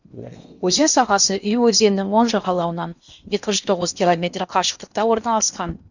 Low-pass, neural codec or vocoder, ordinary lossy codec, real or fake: 7.2 kHz; codec, 16 kHz in and 24 kHz out, 0.8 kbps, FocalCodec, streaming, 65536 codes; none; fake